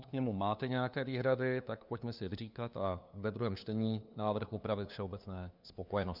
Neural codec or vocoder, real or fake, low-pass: codec, 16 kHz, 2 kbps, FunCodec, trained on LibriTTS, 25 frames a second; fake; 5.4 kHz